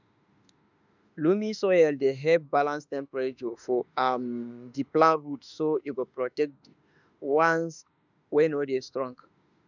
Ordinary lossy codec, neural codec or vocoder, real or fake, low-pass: none; autoencoder, 48 kHz, 32 numbers a frame, DAC-VAE, trained on Japanese speech; fake; 7.2 kHz